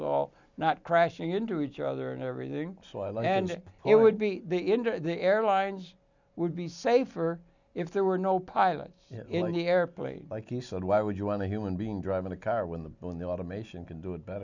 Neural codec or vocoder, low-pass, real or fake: none; 7.2 kHz; real